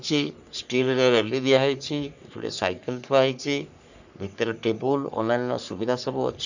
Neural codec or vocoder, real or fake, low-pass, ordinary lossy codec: codec, 44.1 kHz, 3.4 kbps, Pupu-Codec; fake; 7.2 kHz; none